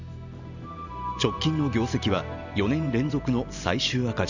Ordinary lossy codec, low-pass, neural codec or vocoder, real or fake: none; 7.2 kHz; vocoder, 44.1 kHz, 128 mel bands every 512 samples, BigVGAN v2; fake